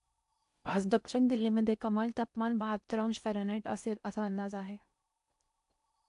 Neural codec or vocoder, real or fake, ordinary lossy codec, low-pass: codec, 16 kHz in and 24 kHz out, 0.6 kbps, FocalCodec, streaming, 4096 codes; fake; none; 10.8 kHz